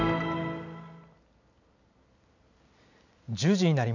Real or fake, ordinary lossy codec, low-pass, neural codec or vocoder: real; none; 7.2 kHz; none